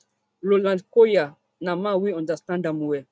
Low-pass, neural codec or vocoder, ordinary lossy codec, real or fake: none; none; none; real